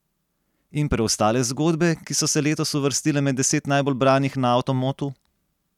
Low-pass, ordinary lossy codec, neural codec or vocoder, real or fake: 19.8 kHz; none; none; real